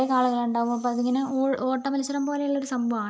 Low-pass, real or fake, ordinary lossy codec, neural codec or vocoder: none; real; none; none